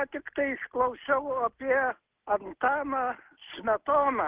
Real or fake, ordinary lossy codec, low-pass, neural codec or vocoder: real; Opus, 16 kbps; 3.6 kHz; none